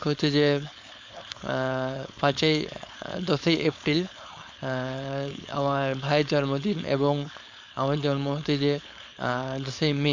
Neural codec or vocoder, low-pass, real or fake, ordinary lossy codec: codec, 16 kHz, 4.8 kbps, FACodec; 7.2 kHz; fake; MP3, 64 kbps